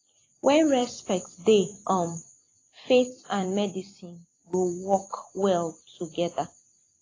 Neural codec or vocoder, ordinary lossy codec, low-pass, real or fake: vocoder, 44.1 kHz, 128 mel bands every 256 samples, BigVGAN v2; AAC, 32 kbps; 7.2 kHz; fake